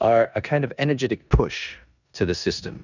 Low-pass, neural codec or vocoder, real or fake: 7.2 kHz; codec, 16 kHz in and 24 kHz out, 0.9 kbps, LongCat-Audio-Codec, four codebook decoder; fake